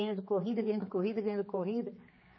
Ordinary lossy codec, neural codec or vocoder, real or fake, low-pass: MP3, 24 kbps; codec, 16 kHz, 4 kbps, X-Codec, HuBERT features, trained on general audio; fake; 7.2 kHz